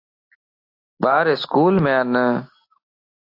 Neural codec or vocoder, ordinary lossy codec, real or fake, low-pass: none; AAC, 32 kbps; real; 5.4 kHz